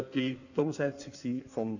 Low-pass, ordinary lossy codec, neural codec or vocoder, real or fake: 7.2 kHz; none; codec, 16 kHz in and 24 kHz out, 1.1 kbps, FireRedTTS-2 codec; fake